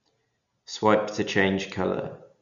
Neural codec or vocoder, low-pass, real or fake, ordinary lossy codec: none; 7.2 kHz; real; none